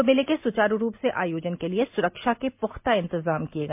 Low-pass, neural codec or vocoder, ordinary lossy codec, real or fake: 3.6 kHz; none; MP3, 32 kbps; real